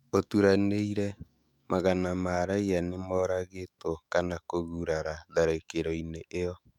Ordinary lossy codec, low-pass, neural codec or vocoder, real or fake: none; 19.8 kHz; autoencoder, 48 kHz, 128 numbers a frame, DAC-VAE, trained on Japanese speech; fake